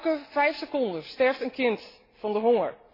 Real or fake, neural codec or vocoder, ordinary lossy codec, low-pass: real; none; AAC, 24 kbps; 5.4 kHz